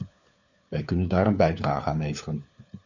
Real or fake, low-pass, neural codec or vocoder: fake; 7.2 kHz; codec, 16 kHz, 4 kbps, FunCodec, trained on LibriTTS, 50 frames a second